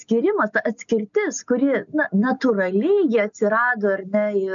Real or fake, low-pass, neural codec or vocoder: real; 7.2 kHz; none